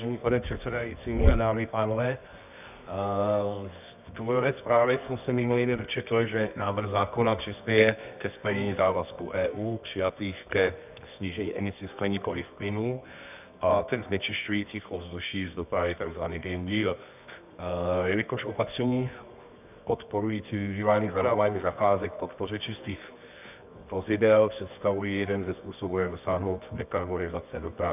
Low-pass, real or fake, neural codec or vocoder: 3.6 kHz; fake; codec, 24 kHz, 0.9 kbps, WavTokenizer, medium music audio release